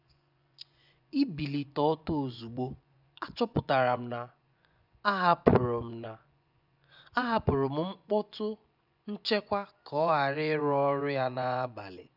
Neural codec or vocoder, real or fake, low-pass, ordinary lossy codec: vocoder, 24 kHz, 100 mel bands, Vocos; fake; 5.4 kHz; none